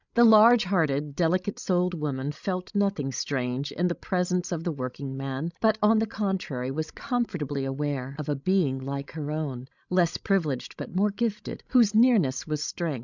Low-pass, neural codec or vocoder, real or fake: 7.2 kHz; codec, 16 kHz, 16 kbps, FreqCodec, larger model; fake